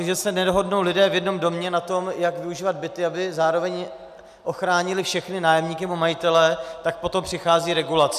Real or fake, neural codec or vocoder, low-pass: real; none; 14.4 kHz